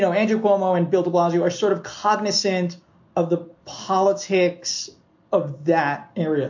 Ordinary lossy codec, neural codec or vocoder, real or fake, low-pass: MP3, 48 kbps; none; real; 7.2 kHz